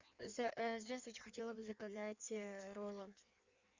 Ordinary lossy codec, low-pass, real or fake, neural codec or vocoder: Opus, 64 kbps; 7.2 kHz; fake; codec, 16 kHz in and 24 kHz out, 1.1 kbps, FireRedTTS-2 codec